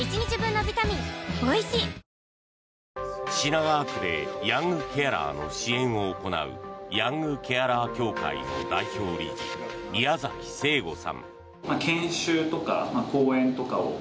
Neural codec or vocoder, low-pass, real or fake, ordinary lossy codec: none; none; real; none